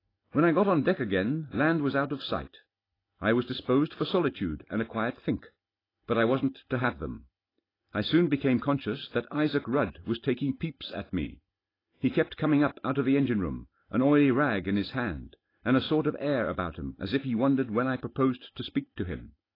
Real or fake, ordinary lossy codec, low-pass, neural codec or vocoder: real; AAC, 24 kbps; 5.4 kHz; none